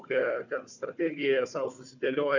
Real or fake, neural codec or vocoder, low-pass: fake; codec, 16 kHz, 4 kbps, FunCodec, trained on Chinese and English, 50 frames a second; 7.2 kHz